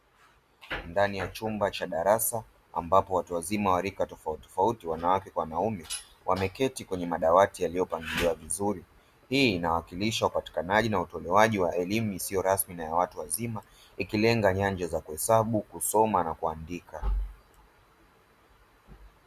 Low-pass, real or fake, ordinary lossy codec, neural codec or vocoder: 14.4 kHz; real; Opus, 64 kbps; none